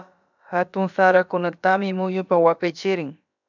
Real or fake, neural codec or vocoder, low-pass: fake; codec, 16 kHz, about 1 kbps, DyCAST, with the encoder's durations; 7.2 kHz